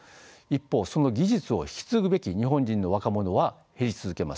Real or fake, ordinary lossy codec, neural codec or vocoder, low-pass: real; none; none; none